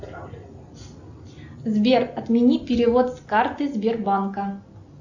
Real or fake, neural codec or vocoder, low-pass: real; none; 7.2 kHz